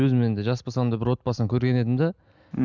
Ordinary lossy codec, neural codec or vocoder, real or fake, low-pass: none; none; real; 7.2 kHz